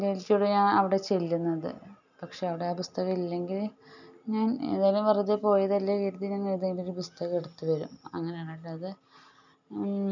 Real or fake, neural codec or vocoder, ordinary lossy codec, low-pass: real; none; none; 7.2 kHz